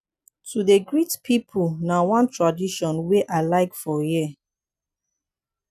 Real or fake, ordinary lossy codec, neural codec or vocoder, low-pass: real; none; none; 14.4 kHz